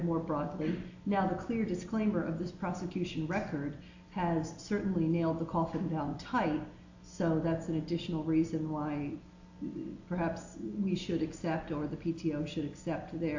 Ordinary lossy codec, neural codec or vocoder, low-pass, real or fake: AAC, 48 kbps; none; 7.2 kHz; real